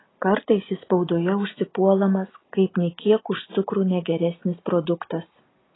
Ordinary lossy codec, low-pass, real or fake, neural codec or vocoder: AAC, 16 kbps; 7.2 kHz; real; none